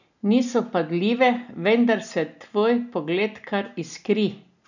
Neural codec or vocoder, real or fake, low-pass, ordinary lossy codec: none; real; 7.2 kHz; none